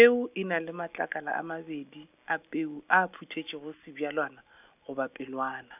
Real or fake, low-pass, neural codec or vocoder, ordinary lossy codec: real; 3.6 kHz; none; none